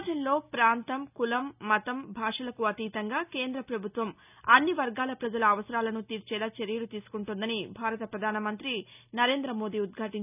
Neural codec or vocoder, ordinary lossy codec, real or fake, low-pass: none; none; real; 3.6 kHz